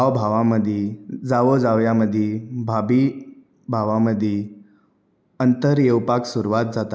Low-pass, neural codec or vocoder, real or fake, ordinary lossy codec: none; none; real; none